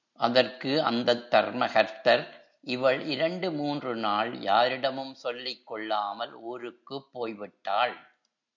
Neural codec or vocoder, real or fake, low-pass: none; real; 7.2 kHz